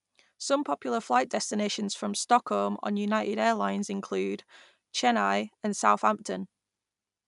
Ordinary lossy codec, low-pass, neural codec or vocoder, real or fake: none; 10.8 kHz; none; real